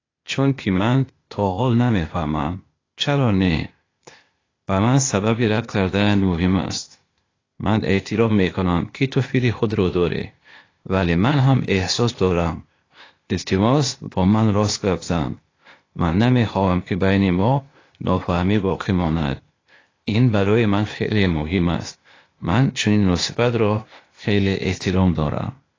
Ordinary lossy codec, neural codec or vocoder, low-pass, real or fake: AAC, 32 kbps; codec, 16 kHz, 0.8 kbps, ZipCodec; 7.2 kHz; fake